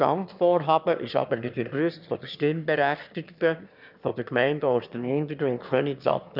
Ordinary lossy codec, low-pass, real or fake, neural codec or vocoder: none; 5.4 kHz; fake; autoencoder, 22.05 kHz, a latent of 192 numbers a frame, VITS, trained on one speaker